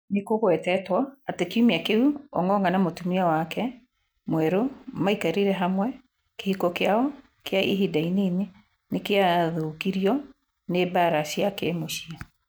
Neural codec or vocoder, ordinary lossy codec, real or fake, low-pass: none; none; real; none